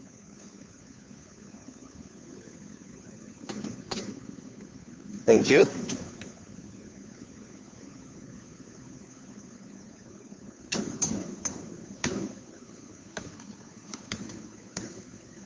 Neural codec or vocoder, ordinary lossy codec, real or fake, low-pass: codec, 16 kHz, 2 kbps, FunCodec, trained on Chinese and English, 25 frames a second; none; fake; none